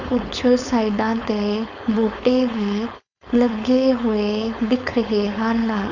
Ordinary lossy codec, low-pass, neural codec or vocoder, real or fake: none; 7.2 kHz; codec, 16 kHz, 4.8 kbps, FACodec; fake